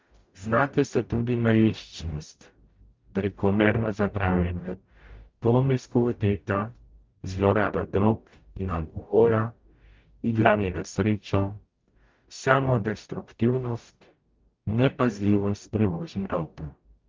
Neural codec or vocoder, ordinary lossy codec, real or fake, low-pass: codec, 44.1 kHz, 0.9 kbps, DAC; Opus, 32 kbps; fake; 7.2 kHz